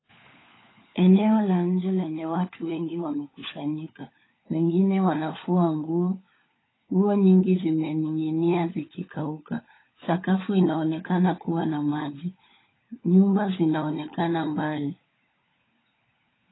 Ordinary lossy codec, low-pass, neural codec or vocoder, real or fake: AAC, 16 kbps; 7.2 kHz; codec, 16 kHz, 16 kbps, FunCodec, trained on LibriTTS, 50 frames a second; fake